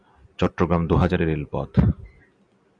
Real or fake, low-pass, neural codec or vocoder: real; 9.9 kHz; none